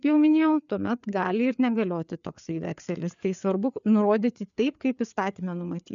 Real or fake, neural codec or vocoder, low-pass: fake; codec, 16 kHz, 4 kbps, FreqCodec, larger model; 7.2 kHz